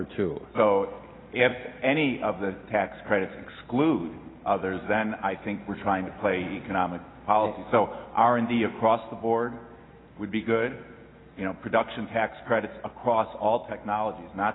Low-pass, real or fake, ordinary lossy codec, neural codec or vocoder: 7.2 kHz; real; AAC, 16 kbps; none